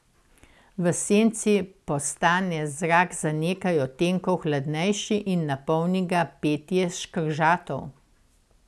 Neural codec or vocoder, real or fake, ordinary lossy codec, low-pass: none; real; none; none